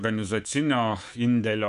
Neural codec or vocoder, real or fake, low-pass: codec, 24 kHz, 3.1 kbps, DualCodec; fake; 10.8 kHz